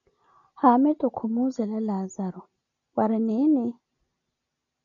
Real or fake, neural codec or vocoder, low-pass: real; none; 7.2 kHz